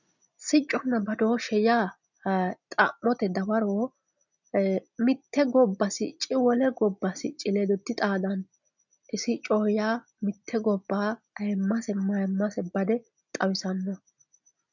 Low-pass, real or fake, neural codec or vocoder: 7.2 kHz; real; none